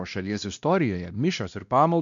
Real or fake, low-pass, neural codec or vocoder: fake; 7.2 kHz; codec, 16 kHz, 1 kbps, X-Codec, WavLM features, trained on Multilingual LibriSpeech